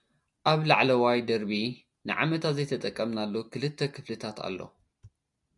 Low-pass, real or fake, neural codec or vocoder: 10.8 kHz; real; none